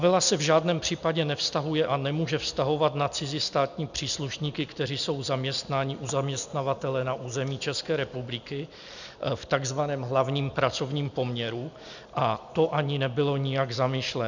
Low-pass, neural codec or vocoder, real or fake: 7.2 kHz; none; real